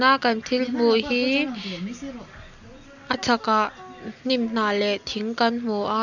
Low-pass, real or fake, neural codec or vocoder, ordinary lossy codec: 7.2 kHz; real; none; none